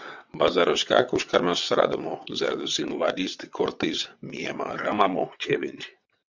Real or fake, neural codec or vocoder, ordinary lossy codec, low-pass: fake; vocoder, 22.05 kHz, 80 mel bands, WaveNeXt; MP3, 64 kbps; 7.2 kHz